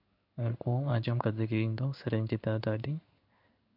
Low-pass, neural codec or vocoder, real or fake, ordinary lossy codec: 5.4 kHz; codec, 16 kHz in and 24 kHz out, 1 kbps, XY-Tokenizer; fake; MP3, 48 kbps